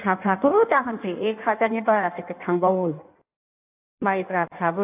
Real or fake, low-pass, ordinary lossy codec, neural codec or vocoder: fake; 3.6 kHz; AAC, 32 kbps; codec, 16 kHz in and 24 kHz out, 1.1 kbps, FireRedTTS-2 codec